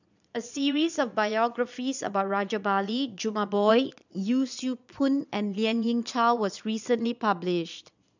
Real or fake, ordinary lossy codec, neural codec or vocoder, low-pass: fake; none; vocoder, 22.05 kHz, 80 mel bands, Vocos; 7.2 kHz